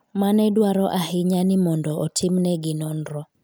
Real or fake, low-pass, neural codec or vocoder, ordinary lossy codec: real; none; none; none